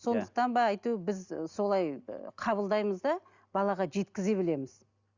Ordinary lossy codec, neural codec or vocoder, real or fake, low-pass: Opus, 64 kbps; none; real; 7.2 kHz